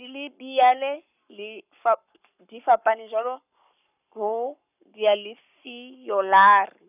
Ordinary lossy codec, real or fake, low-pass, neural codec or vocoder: none; fake; 3.6 kHz; codec, 44.1 kHz, 7.8 kbps, Pupu-Codec